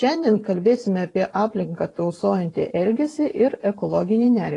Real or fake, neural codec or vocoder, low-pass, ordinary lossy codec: fake; vocoder, 44.1 kHz, 128 mel bands every 512 samples, BigVGAN v2; 10.8 kHz; AAC, 32 kbps